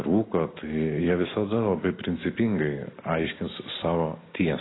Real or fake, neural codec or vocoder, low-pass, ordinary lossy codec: real; none; 7.2 kHz; AAC, 16 kbps